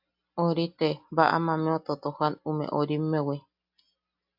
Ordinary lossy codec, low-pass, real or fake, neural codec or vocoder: AAC, 48 kbps; 5.4 kHz; real; none